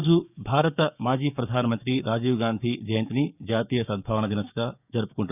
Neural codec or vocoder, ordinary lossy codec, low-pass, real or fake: codec, 16 kHz, 6 kbps, DAC; none; 3.6 kHz; fake